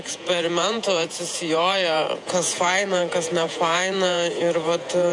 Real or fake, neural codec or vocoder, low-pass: fake; vocoder, 48 kHz, 128 mel bands, Vocos; 10.8 kHz